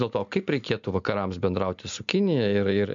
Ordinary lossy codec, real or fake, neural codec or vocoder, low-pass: MP3, 64 kbps; real; none; 7.2 kHz